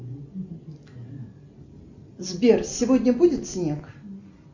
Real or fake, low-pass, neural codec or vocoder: real; 7.2 kHz; none